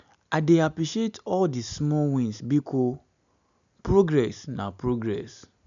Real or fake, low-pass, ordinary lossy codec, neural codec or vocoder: real; 7.2 kHz; none; none